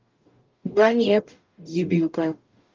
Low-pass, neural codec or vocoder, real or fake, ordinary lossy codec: 7.2 kHz; codec, 44.1 kHz, 0.9 kbps, DAC; fake; Opus, 32 kbps